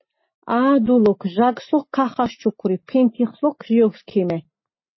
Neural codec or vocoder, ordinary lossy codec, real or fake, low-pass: none; MP3, 24 kbps; real; 7.2 kHz